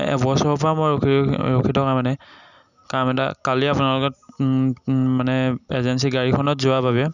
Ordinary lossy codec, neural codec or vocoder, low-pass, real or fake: none; none; 7.2 kHz; real